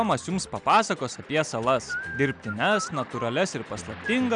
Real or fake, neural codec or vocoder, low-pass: real; none; 9.9 kHz